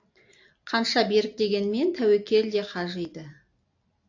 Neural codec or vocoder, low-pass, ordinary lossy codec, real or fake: none; 7.2 kHz; AAC, 48 kbps; real